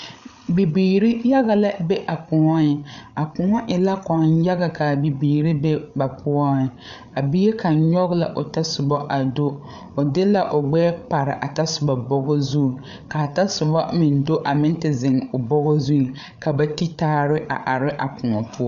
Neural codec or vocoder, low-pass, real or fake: codec, 16 kHz, 8 kbps, FreqCodec, larger model; 7.2 kHz; fake